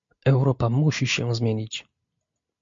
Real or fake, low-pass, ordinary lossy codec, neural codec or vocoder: real; 7.2 kHz; MP3, 48 kbps; none